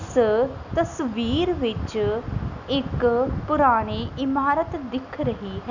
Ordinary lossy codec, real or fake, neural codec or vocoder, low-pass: none; real; none; 7.2 kHz